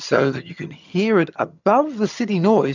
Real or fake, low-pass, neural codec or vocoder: fake; 7.2 kHz; vocoder, 22.05 kHz, 80 mel bands, HiFi-GAN